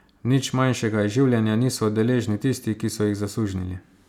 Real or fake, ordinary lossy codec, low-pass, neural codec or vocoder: real; none; 19.8 kHz; none